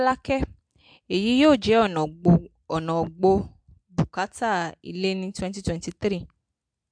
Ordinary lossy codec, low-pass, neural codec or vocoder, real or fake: MP3, 64 kbps; 9.9 kHz; none; real